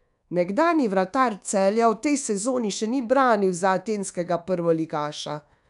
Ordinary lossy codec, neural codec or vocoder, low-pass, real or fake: none; codec, 24 kHz, 1.2 kbps, DualCodec; 10.8 kHz; fake